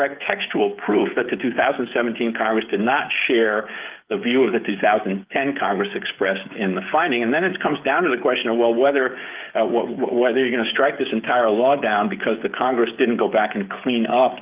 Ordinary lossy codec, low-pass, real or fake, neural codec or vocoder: Opus, 24 kbps; 3.6 kHz; fake; codec, 16 kHz, 8 kbps, FreqCodec, smaller model